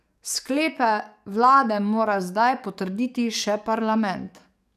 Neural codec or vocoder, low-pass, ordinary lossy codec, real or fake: codec, 44.1 kHz, 7.8 kbps, DAC; 14.4 kHz; none; fake